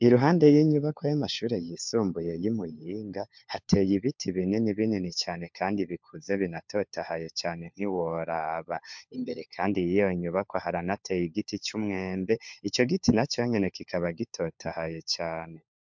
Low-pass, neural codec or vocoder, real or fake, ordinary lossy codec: 7.2 kHz; codec, 16 kHz, 4 kbps, FunCodec, trained on LibriTTS, 50 frames a second; fake; MP3, 64 kbps